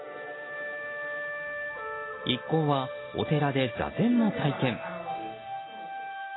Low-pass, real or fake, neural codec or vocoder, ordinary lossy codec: 7.2 kHz; real; none; AAC, 16 kbps